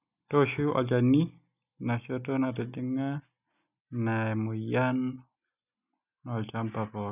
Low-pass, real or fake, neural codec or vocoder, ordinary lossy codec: 3.6 kHz; real; none; none